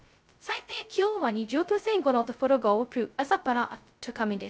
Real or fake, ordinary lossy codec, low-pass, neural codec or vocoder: fake; none; none; codec, 16 kHz, 0.2 kbps, FocalCodec